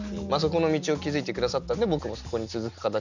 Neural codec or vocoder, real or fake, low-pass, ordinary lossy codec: none; real; 7.2 kHz; Opus, 64 kbps